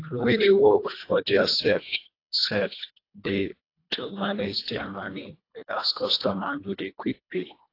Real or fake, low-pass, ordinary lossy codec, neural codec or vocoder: fake; 5.4 kHz; AAC, 32 kbps; codec, 24 kHz, 1.5 kbps, HILCodec